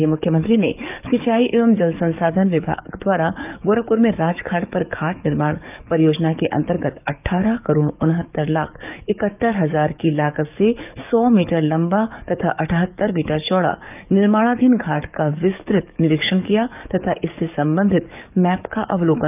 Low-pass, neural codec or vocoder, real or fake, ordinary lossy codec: 3.6 kHz; codec, 44.1 kHz, 7.8 kbps, DAC; fake; none